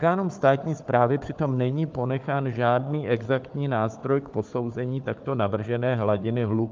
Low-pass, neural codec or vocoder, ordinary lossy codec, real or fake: 7.2 kHz; codec, 16 kHz, 4 kbps, FunCodec, trained on Chinese and English, 50 frames a second; Opus, 24 kbps; fake